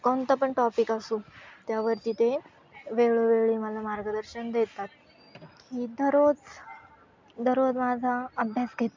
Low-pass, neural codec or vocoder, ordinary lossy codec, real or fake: 7.2 kHz; none; MP3, 64 kbps; real